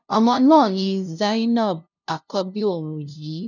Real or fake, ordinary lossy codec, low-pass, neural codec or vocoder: fake; none; 7.2 kHz; codec, 16 kHz, 0.5 kbps, FunCodec, trained on LibriTTS, 25 frames a second